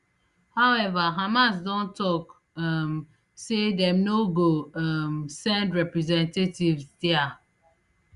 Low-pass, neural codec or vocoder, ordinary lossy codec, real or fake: 10.8 kHz; none; Opus, 64 kbps; real